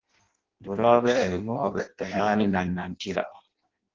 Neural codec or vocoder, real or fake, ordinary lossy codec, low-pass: codec, 16 kHz in and 24 kHz out, 0.6 kbps, FireRedTTS-2 codec; fake; Opus, 16 kbps; 7.2 kHz